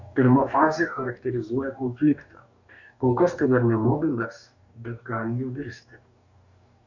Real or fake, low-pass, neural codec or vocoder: fake; 7.2 kHz; codec, 44.1 kHz, 2.6 kbps, DAC